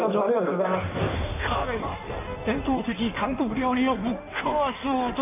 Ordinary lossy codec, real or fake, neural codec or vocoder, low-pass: none; fake; codec, 16 kHz in and 24 kHz out, 1.1 kbps, FireRedTTS-2 codec; 3.6 kHz